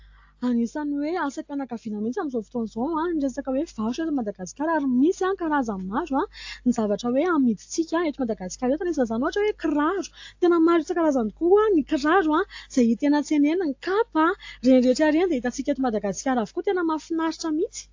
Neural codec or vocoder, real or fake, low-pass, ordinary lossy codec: none; real; 7.2 kHz; AAC, 48 kbps